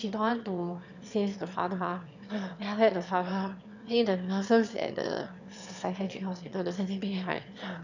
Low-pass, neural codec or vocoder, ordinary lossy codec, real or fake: 7.2 kHz; autoencoder, 22.05 kHz, a latent of 192 numbers a frame, VITS, trained on one speaker; none; fake